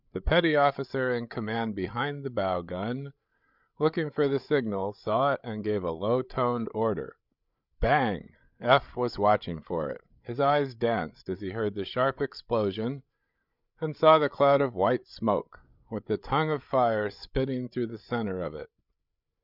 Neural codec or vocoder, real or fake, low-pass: codec, 16 kHz, 8 kbps, FreqCodec, larger model; fake; 5.4 kHz